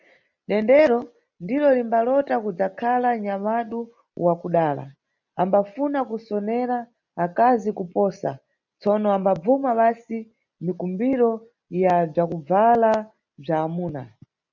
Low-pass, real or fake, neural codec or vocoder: 7.2 kHz; real; none